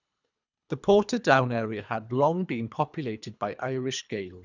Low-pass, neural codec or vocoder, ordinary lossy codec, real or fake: 7.2 kHz; codec, 24 kHz, 3 kbps, HILCodec; none; fake